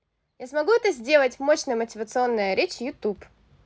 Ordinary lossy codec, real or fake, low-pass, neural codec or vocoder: none; real; none; none